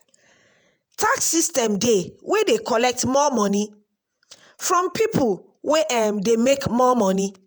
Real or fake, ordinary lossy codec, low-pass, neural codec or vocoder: fake; none; none; vocoder, 48 kHz, 128 mel bands, Vocos